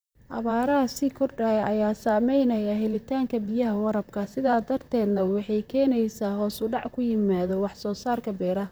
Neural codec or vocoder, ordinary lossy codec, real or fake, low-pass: vocoder, 44.1 kHz, 128 mel bands, Pupu-Vocoder; none; fake; none